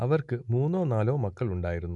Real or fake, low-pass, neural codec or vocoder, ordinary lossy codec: real; 10.8 kHz; none; none